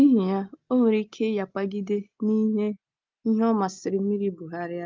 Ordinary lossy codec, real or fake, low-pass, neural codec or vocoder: Opus, 24 kbps; real; 7.2 kHz; none